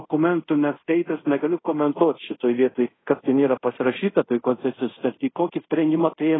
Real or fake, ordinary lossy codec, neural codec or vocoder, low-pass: fake; AAC, 16 kbps; codec, 24 kHz, 0.5 kbps, DualCodec; 7.2 kHz